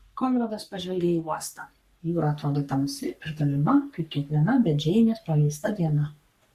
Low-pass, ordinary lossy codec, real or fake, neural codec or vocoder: 14.4 kHz; Opus, 64 kbps; fake; codec, 44.1 kHz, 3.4 kbps, Pupu-Codec